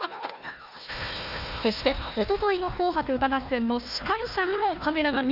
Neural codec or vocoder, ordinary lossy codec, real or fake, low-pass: codec, 16 kHz, 1 kbps, FunCodec, trained on Chinese and English, 50 frames a second; none; fake; 5.4 kHz